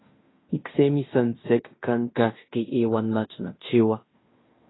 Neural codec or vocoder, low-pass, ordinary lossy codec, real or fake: codec, 16 kHz in and 24 kHz out, 0.9 kbps, LongCat-Audio-Codec, fine tuned four codebook decoder; 7.2 kHz; AAC, 16 kbps; fake